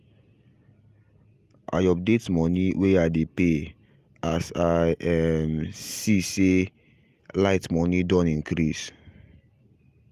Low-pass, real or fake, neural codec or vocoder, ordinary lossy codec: 14.4 kHz; real; none; Opus, 32 kbps